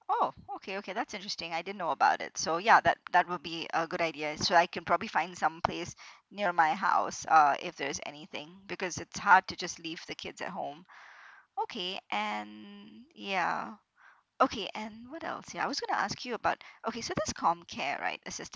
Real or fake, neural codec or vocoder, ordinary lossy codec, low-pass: real; none; none; none